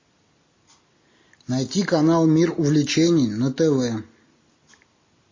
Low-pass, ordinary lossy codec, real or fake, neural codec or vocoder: 7.2 kHz; MP3, 32 kbps; real; none